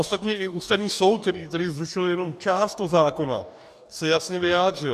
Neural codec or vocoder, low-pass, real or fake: codec, 44.1 kHz, 2.6 kbps, DAC; 14.4 kHz; fake